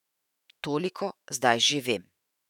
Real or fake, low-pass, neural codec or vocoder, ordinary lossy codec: fake; 19.8 kHz; autoencoder, 48 kHz, 128 numbers a frame, DAC-VAE, trained on Japanese speech; none